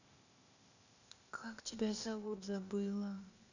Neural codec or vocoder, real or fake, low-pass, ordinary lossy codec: codec, 16 kHz, 0.8 kbps, ZipCodec; fake; 7.2 kHz; none